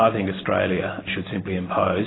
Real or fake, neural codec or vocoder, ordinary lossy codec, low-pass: real; none; AAC, 16 kbps; 7.2 kHz